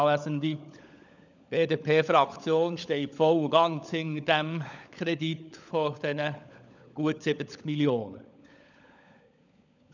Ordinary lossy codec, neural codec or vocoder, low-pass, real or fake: none; codec, 16 kHz, 16 kbps, FunCodec, trained on LibriTTS, 50 frames a second; 7.2 kHz; fake